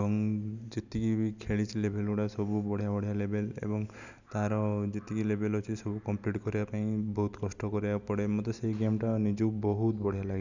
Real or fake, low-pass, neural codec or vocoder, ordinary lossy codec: real; 7.2 kHz; none; none